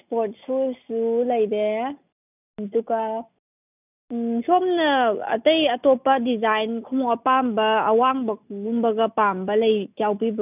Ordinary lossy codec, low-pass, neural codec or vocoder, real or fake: none; 3.6 kHz; none; real